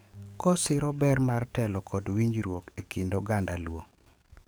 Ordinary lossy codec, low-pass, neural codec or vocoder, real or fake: none; none; codec, 44.1 kHz, 7.8 kbps, DAC; fake